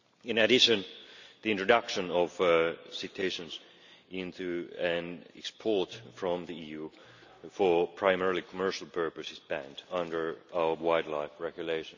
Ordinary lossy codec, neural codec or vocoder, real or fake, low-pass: none; none; real; 7.2 kHz